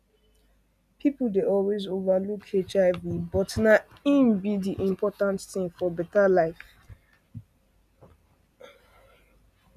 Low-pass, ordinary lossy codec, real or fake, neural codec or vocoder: 14.4 kHz; none; real; none